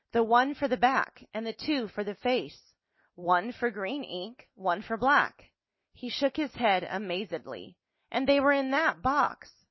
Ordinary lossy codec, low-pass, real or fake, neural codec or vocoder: MP3, 24 kbps; 7.2 kHz; real; none